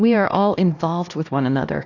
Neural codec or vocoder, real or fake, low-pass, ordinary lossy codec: codec, 16 kHz, 1 kbps, X-Codec, HuBERT features, trained on LibriSpeech; fake; 7.2 kHz; AAC, 48 kbps